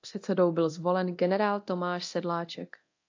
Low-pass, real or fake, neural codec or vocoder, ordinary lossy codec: 7.2 kHz; fake; codec, 24 kHz, 0.9 kbps, DualCodec; AAC, 48 kbps